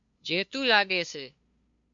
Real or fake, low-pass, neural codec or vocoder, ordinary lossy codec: fake; 7.2 kHz; codec, 16 kHz, 2 kbps, FunCodec, trained on LibriTTS, 25 frames a second; MP3, 64 kbps